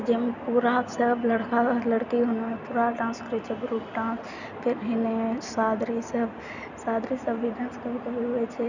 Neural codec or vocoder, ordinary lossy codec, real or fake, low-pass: none; none; real; 7.2 kHz